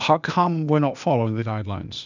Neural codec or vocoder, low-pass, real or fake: codec, 16 kHz, 0.8 kbps, ZipCodec; 7.2 kHz; fake